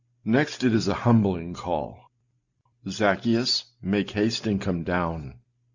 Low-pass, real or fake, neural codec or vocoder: 7.2 kHz; real; none